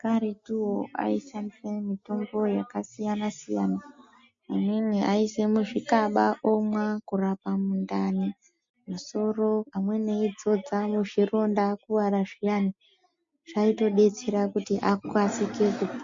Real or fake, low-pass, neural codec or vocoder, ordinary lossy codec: real; 7.2 kHz; none; MP3, 48 kbps